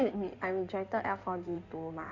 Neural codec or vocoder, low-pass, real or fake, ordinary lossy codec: codec, 16 kHz in and 24 kHz out, 2.2 kbps, FireRedTTS-2 codec; 7.2 kHz; fake; none